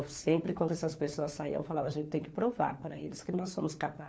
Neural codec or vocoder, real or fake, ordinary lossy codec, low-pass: codec, 16 kHz, 4 kbps, FunCodec, trained on LibriTTS, 50 frames a second; fake; none; none